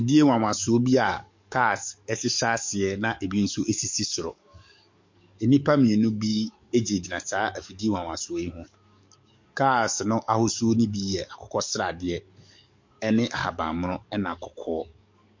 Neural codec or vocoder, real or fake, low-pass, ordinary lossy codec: codec, 44.1 kHz, 7.8 kbps, DAC; fake; 7.2 kHz; MP3, 48 kbps